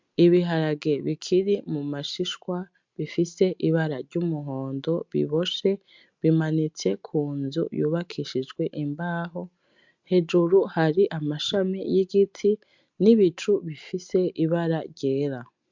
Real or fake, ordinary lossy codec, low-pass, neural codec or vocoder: real; MP3, 64 kbps; 7.2 kHz; none